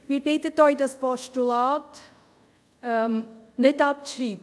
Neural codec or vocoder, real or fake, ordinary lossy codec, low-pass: codec, 24 kHz, 0.5 kbps, DualCodec; fake; none; none